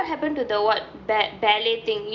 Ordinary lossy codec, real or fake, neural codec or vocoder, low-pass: none; real; none; 7.2 kHz